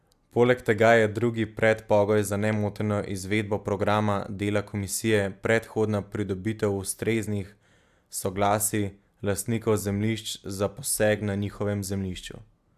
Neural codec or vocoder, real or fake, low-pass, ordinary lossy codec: none; real; 14.4 kHz; AAC, 96 kbps